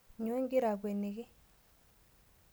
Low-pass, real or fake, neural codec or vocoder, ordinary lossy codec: none; real; none; none